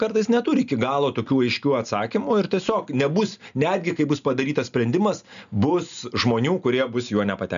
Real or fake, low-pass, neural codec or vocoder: real; 7.2 kHz; none